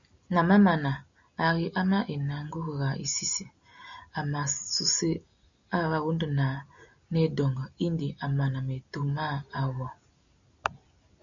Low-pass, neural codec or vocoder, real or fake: 7.2 kHz; none; real